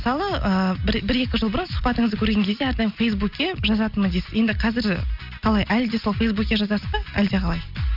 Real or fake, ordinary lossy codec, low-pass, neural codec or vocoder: real; none; 5.4 kHz; none